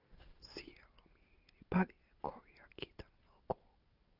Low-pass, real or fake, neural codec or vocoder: 5.4 kHz; real; none